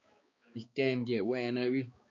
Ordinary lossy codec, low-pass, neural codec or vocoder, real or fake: MP3, 48 kbps; 7.2 kHz; codec, 16 kHz, 2 kbps, X-Codec, HuBERT features, trained on balanced general audio; fake